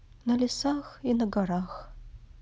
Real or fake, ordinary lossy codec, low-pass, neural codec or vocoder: real; none; none; none